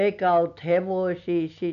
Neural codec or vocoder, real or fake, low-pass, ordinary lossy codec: none; real; 7.2 kHz; none